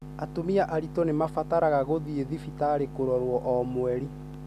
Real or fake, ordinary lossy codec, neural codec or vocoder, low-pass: real; none; none; 14.4 kHz